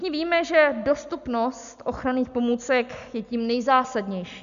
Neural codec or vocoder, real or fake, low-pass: none; real; 7.2 kHz